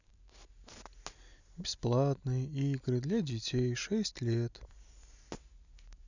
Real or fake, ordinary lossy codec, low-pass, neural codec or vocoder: real; none; 7.2 kHz; none